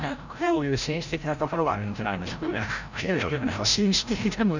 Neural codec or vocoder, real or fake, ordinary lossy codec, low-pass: codec, 16 kHz, 0.5 kbps, FreqCodec, larger model; fake; none; 7.2 kHz